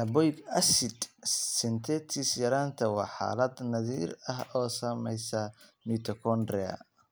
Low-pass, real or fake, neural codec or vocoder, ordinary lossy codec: none; real; none; none